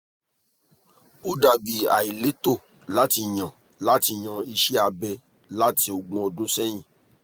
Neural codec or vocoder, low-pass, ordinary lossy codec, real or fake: none; none; none; real